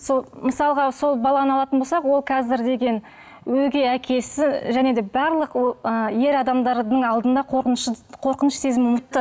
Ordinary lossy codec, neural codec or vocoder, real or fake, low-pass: none; none; real; none